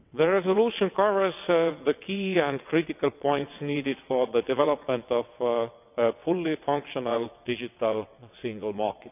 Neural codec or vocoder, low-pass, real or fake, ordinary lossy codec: vocoder, 22.05 kHz, 80 mel bands, WaveNeXt; 3.6 kHz; fake; none